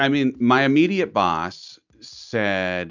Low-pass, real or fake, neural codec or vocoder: 7.2 kHz; real; none